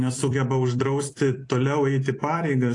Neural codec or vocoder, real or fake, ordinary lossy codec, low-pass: vocoder, 44.1 kHz, 128 mel bands every 256 samples, BigVGAN v2; fake; AAC, 48 kbps; 10.8 kHz